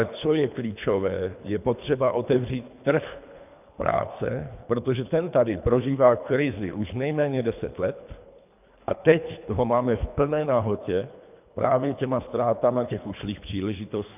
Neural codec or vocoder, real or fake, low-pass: codec, 24 kHz, 3 kbps, HILCodec; fake; 3.6 kHz